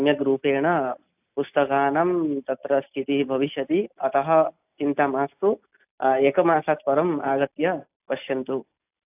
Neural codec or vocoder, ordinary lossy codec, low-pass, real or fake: none; AAC, 32 kbps; 3.6 kHz; real